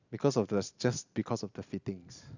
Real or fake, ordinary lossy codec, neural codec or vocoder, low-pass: real; none; none; 7.2 kHz